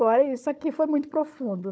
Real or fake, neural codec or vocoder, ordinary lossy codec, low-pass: fake; codec, 16 kHz, 4 kbps, FunCodec, trained on Chinese and English, 50 frames a second; none; none